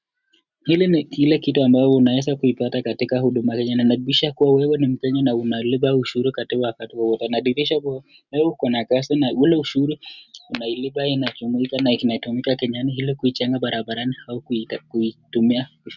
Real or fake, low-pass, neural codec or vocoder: real; 7.2 kHz; none